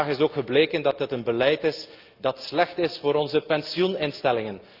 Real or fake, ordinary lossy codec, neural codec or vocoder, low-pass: real; Opus, 32 kbps; none; 5.4 kHz